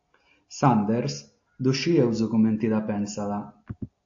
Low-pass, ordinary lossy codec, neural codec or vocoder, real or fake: 7.2 kHz; AAC, 48 kbps; none; real